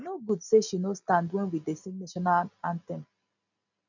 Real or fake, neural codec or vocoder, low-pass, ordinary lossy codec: real; none; 7.2 kHz; none